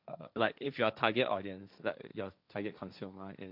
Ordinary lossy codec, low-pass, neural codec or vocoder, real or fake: none; 5.4 kHz; codec, 16 kHz, 1.1 kbps, Voila-Tokenizer; fake